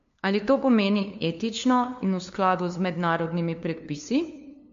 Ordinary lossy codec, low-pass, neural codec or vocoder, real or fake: MP3, 48 kbps; 7.2 kHz; codec, 16 kHz, 2 kbps, FunCodec, trained on LibriTTS, 25 frames a second; fake